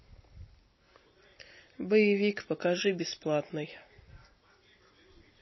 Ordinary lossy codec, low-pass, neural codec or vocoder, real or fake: MP3, 24 kbps; 7.2 kHz; none; real